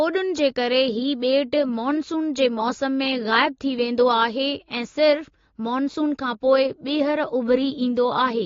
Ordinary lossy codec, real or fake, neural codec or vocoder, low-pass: AAC, 32 kbps; real; none; 7.2 kHz